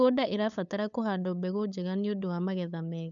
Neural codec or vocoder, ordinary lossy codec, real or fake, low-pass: codec, 16 kHz, 8 kbps, FunCodec, trained on LibriTTS, 25 frames a second; none; fake; 7.2 kHz